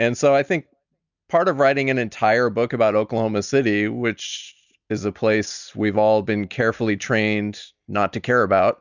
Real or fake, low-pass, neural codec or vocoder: real; 7.2 kHz; none